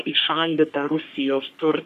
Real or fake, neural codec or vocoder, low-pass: fake; codec, 44.1 kHz, 3.4 kbps, Pupu-Codec; 14.4 kHz